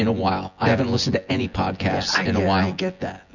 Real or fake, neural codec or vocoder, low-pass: fake; vocoder, 24 kHz, 100 mel bands, Vocos; 7.2 kHz